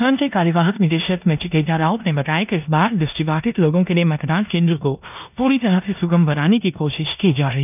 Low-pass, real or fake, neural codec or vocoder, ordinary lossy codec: 3.6 kHz; fake; codec, 16 kHz in and 24 kHz out, 0.9 kbps, LongCat-Audio-Codec, four codebook decoder; none